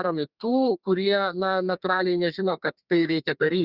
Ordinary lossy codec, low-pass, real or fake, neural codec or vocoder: Opus, 64 kbps; 5.4 kHz; fake; codec, 32 kHz, 1.9 kbps, SNAC